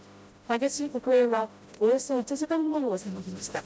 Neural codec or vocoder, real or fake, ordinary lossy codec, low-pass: codec, 16 kHz, 0.5 kbps, FreqCodec, smaller model; fake; none; none